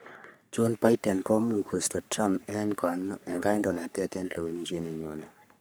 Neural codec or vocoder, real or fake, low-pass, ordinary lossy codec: codec, 44.1 kHz, 3.4 kbps, Pupu-Codec; fake; none; none